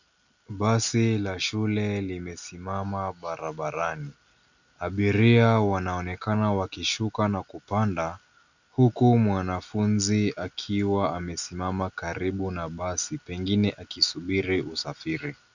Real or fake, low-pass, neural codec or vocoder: real; 7.2 kHz; none